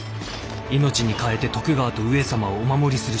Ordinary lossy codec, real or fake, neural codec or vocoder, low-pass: none; real; none; none